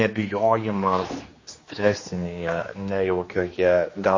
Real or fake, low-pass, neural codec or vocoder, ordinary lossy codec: fake; 7.2 kHz; codec, 16 kHz, 2 kbps, X-Codec, HuBERT features, trained on general audio; MP3, 32 kbps